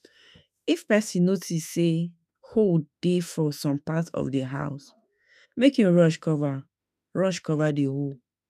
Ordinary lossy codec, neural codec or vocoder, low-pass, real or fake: none; autoencoder, 48 kHz, 32 numbers a frame, DAC-VAE, trained on Japanese speech; 14.4 kHz; fake